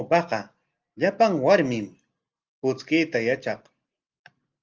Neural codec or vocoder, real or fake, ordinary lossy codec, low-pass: none; real; Opus, 24 kbps; 7.2 kHz